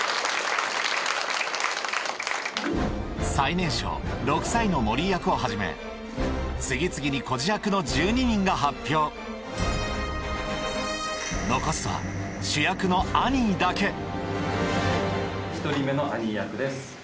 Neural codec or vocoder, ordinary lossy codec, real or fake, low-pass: none; none; real; none